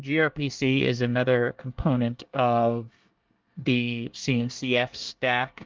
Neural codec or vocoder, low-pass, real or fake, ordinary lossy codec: codec, 24 kHz, 1 kbps, SNAC; 7.2 kHz; fake; Opus, 24 kbps